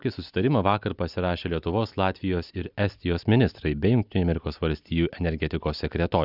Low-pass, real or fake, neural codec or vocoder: 5.4 kHz; real; none